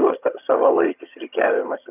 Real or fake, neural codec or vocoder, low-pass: fake; vocoder, 22.05 kHz, 80 mel bands, HiFi-GAN; 3.6 kHz